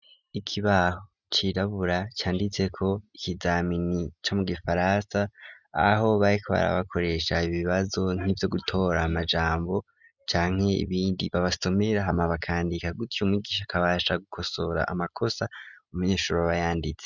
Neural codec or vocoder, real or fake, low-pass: none; real; 7.2 kHz